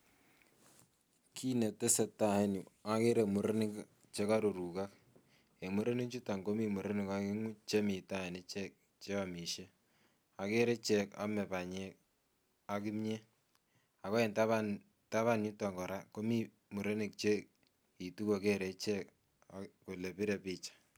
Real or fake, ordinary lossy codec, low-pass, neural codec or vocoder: real; none; none; none